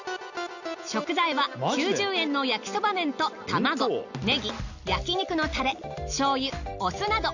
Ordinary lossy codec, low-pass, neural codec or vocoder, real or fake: none; 7.2 kHz; none; real